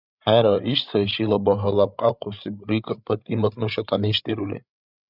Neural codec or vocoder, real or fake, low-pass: codec, 16 kHz, 8 kbps, FreqCodec, larger model; fake; 5.4 kHz